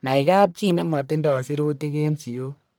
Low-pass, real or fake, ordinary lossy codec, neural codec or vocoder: none; fake; none; codec, 44.1 kHz, 1.7 kbps, Pupu-Codec